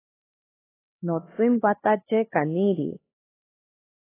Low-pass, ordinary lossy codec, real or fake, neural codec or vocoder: 3.6 kHz; AAC, 16 kbps; fake; codec, 16 kHz, 2 kbps, X-Codec, HuBERT features, trained on LibriSpeech